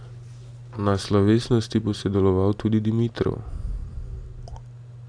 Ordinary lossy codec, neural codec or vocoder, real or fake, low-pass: none; none; real; 9.9 kHz